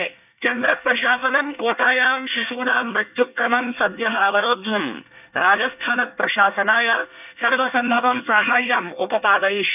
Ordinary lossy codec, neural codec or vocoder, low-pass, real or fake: none; codec, 24 kHz, 1 kbps, SNAC; 3.6 kHz; fake